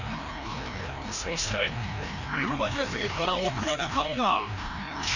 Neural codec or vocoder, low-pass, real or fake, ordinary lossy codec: codec, 16 kHz, 1 kbps, FreqCodec, larger model; 7.2 kHz; fake; none